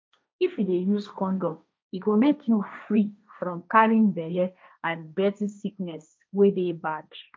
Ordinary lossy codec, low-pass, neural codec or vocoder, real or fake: none; none; codec, 16 kHz, 1.1 kbps, Voila-Tokenizer; fake